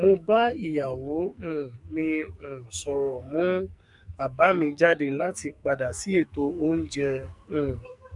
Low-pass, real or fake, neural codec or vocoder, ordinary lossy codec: 10.8 kHz; fake; codec, 44.1 kHz, 2.6 kbps, SNAC; none